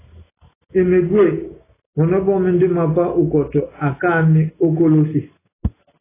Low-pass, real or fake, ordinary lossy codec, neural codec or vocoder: 3.6 kHz; real; AAC, 16 kbps; none